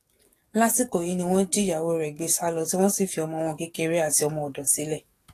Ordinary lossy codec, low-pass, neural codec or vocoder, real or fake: AAC, 48 kbps; 14.4 kHz; codec, 44.1 kHz, 7.8 kbps, DAC; fake